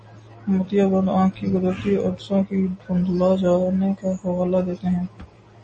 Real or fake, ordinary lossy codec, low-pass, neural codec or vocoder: real; MP3, 32 kbps; 10.8 kHz; none